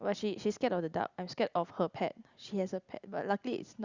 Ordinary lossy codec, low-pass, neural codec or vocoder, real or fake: Opus, 64 kbps; 7.2 kHz; none; real